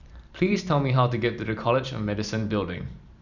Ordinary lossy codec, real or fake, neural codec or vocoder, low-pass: none; fake; vocoder, 44.1 kHz, 128 mel bands every 512 samples, BigVGAN v2; 7.2 kHz